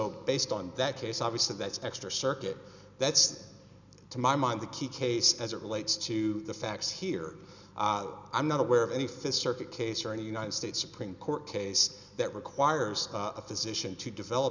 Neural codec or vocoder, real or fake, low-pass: none; real; 7.2 kHz